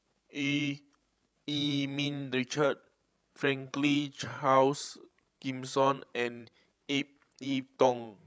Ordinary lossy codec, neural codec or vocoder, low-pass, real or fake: none; codec, 16 kHz, 16 kbps, FreqCodec, larger model; none; fake